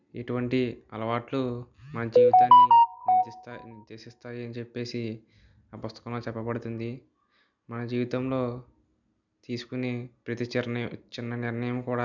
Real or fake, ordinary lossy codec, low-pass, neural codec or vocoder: real; none; 7.2 kHz; none